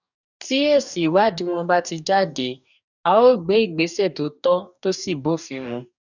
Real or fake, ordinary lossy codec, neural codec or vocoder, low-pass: fake; none; codec, 44.1 kHz, 2.6 kbps, DAC; 7.2 kHz